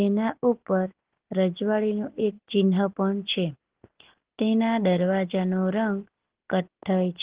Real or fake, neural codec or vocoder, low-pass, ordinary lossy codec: real; none; 3.6 kHz; Opus, 16 kbps